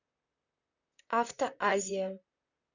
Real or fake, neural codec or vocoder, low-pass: fake; vocoder, 44.1 kHz, 128 mel bands, Pupu-Vocoder; 7.2 kHz